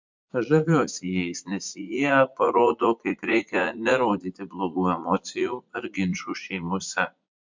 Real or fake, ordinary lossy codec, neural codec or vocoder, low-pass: fake; MP3, 64 kbps; vocoder, 44.1 kHz, 80 mel bands, Vocos; 7.2 kHz